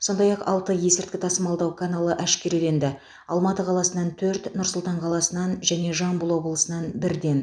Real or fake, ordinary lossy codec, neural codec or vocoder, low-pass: real; none; none; 9.9 kHz